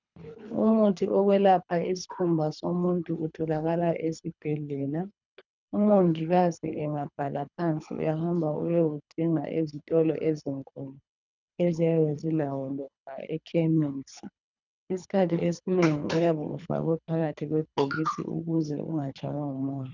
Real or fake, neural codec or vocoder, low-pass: fake; codec, 24 kHz, 3 kbps, HILCodec; 7.2 kHz